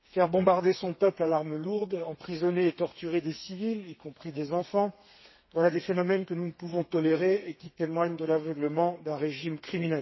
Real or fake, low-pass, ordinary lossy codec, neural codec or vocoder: fake; 7.2 kHz; MP3, 24 kbps; codec, 32 kHz, 1.9 kbps, SNAC